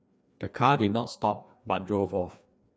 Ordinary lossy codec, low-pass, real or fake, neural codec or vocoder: none; none; fake; codec, 16 kHz, 2 kbps, FreqCodec, larger model